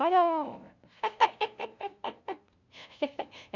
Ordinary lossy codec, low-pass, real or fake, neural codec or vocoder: none; 7.2 kHz; fake; codec, 16 kHz, 0.5 kbps, FunCodec, trained on LibriTTS, 25 frames a second